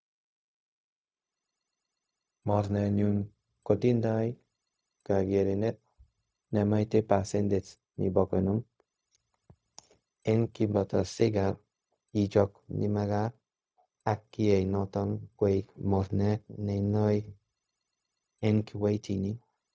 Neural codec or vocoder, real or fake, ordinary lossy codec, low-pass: codec, 16 kHz, 0.4 kbps, LongCat-Audio-Codec; fake; none; none